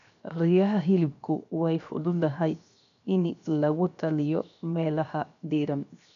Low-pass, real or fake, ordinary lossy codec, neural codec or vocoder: 7.2 kHz; fake; none; codec, 16 kHz, 0.7 kbps, FocalCodec